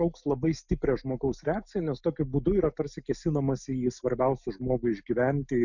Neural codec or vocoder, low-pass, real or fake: none; 7.2 kHz; real